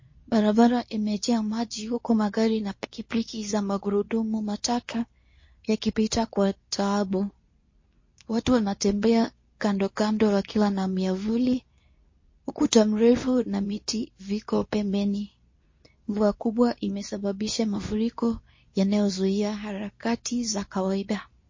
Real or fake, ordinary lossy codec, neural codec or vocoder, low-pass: fake; MP3, 32 kbps; codec, 24 kHz, 0.9 kbps, WavTokenizer, medium speech release version 2; 7.2 kHz